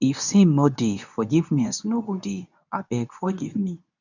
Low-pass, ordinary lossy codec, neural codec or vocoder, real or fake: 7.2 kHz; none; codec, 24 kHz, 0.9 kbps, WavTokenizer, medium speech release version 2; fake